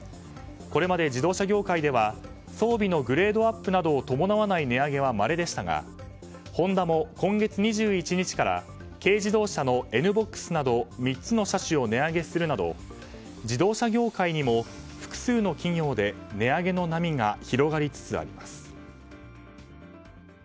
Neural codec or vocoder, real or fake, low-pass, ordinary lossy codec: none; real; none; none